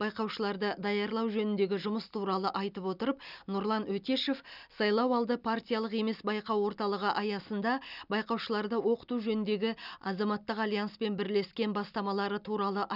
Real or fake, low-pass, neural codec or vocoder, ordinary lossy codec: real; 5.4 kHz; none; none